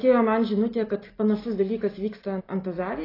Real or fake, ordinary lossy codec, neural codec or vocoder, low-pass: real; AAC, 24 kbps; none; 5.4 kHz